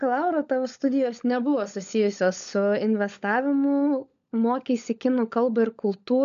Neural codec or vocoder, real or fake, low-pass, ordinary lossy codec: codec, 16 kHz, 16 kbps, FunCodec, trained on LibriTTS, 50 frames a second; fake; 7.2 kHz; AAC, 96 kbps